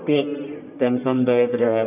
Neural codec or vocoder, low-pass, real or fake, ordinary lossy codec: codec, 44.1 kHz, 1.7 kbps, Pupu-Codec; 3.6 kHz; fake; none